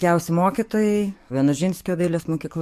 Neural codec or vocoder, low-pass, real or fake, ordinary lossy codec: codec, 44.1 kHz, 7.8 kbps, DAC; 14.4 kHz; fake; MP3, 64 kbps